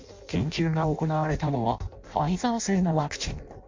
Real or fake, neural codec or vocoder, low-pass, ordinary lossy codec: fake; codec, 16 kHz in and 24 kHz out, 0.6 kbps, FireRedTTS-2 codec; 7.2 kHz; MP3, 48 kbps